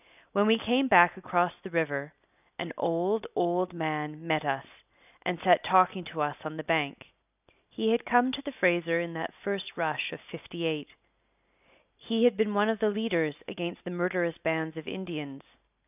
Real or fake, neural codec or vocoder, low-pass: real; none; 3.6 kHz